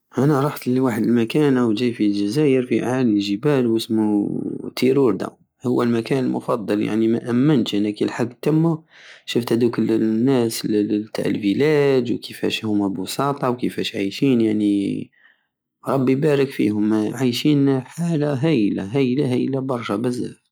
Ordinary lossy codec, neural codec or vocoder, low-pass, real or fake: none; none; none; real